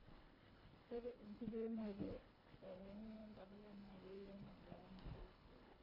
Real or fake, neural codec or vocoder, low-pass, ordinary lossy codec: fake; codec, 24 kHz, 1.5 kbps, HILCodec; 5.4 kHz; none